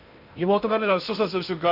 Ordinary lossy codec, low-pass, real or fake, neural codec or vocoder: none; 5.4 kHz; fake; codec, 16 kHz in and 24 kHz out, 0.6 kbps, FocalCodec, streaming, 2048 codes